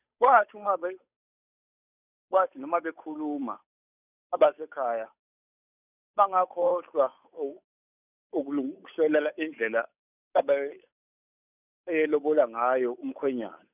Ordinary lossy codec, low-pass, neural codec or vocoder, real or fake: none; 3.6 kHz; codec, 16 kHz, 8 kbps, FunCodec, trained on Chinese and English, 25 frames a second; fake